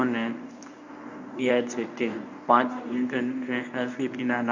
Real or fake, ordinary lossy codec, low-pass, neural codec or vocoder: fake; none; 7.2 kHz; codec, 24 kHz, 0.9 kbps, WavTokenizer, medium speech release version 1